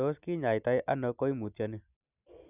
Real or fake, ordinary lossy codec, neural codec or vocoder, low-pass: real; none; none; 3.6 kHz